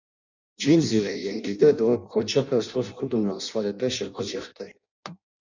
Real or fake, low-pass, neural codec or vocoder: fake; 7.2 kHz; codec, 16 kHz in and 24 kHz out, 0.6 kbps, FireRedTTS-2 codec